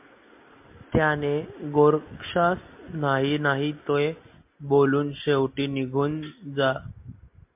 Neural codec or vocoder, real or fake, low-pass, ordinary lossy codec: none; real; 3.6 kHz; MP3, 32 kbps